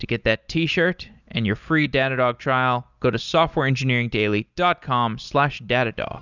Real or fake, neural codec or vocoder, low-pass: real; none; 7.2 kHz